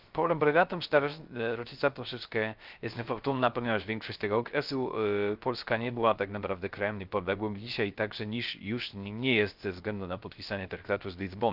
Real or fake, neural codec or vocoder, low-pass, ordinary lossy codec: fake; codec, 16 kHz, 0.3 kbps, FocalCodec; 5.4 kHz; Opus, 32 kbps